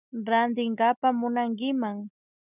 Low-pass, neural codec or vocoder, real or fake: 3.6 kHz; none; real